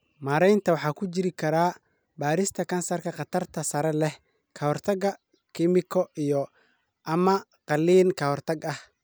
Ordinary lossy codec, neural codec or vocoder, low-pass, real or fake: none; none; none; real